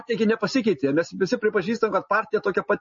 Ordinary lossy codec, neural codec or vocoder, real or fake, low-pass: MP3, 32 kbps; none; real; 7.2 kHz